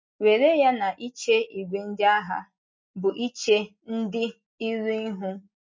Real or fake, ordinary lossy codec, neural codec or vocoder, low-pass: real; MP3, 32 kbps; none; 7.2 kHz